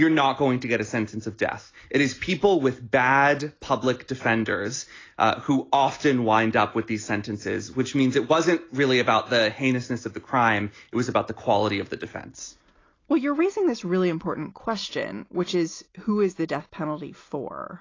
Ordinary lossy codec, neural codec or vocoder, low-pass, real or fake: AAC, 32 kbps; none; 7.2 kHz; real